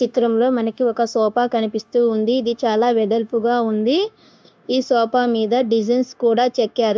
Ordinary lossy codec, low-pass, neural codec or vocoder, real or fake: none; none; codec, 16 kHz, 0.9 kbps, LongCat-Audio-Codec; fake